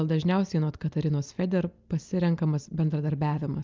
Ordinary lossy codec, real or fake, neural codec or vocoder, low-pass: Opus, 24 kbps; real; none; 7.2 kHz